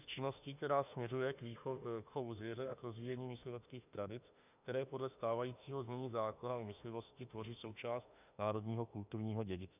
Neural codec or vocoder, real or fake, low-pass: autoencoder, 48 kHz, 32 numbers a frame, DAC-VAE, trained on Japanese speech; fake; 3.6 kHz